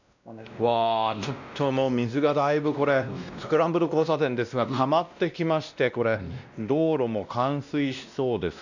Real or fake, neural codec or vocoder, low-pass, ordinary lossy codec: fake; codec, 16 kHz, 1 kbps, X-Codec, WavLM features, trained on Multilingual LibriSpeech; 7.2 kHz; none